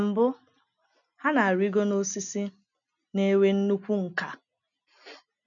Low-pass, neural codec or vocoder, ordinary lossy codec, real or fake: 7.2 kHz; none; none; real